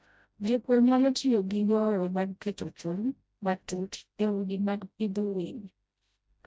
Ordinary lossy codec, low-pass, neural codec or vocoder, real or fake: none; none; codec, 16 kHz, 0.5 kbps, FreqCodec, smaller model; fake